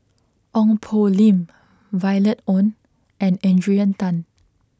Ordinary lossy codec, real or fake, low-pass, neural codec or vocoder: none; real; none; none